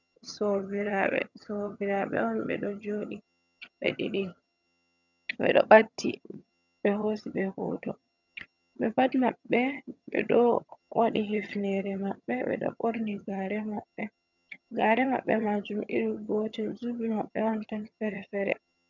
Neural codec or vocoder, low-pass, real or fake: vocoder, 22.05 kHz, 80 mel bands, HiFi-GAN; 7.2 kHz; fake